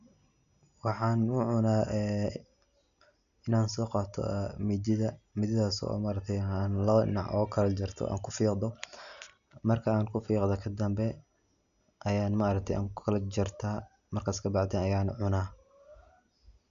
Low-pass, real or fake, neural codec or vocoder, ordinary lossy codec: 7.2 kHz; real; none; none